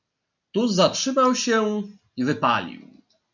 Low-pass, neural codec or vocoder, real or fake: 7.2 kHz; none; real